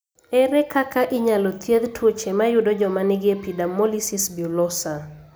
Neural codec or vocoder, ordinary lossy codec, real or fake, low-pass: none; none; real; none